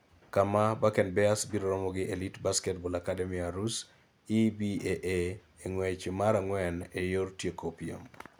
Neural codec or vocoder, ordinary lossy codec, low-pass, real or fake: none; none; none; real